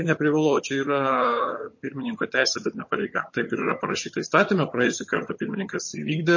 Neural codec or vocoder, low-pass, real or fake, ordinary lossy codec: vocoder, 22.05 kHz, 80 mel bands, HiFi-GAN; 7.2 kHz; fake; MP3, 32 kbps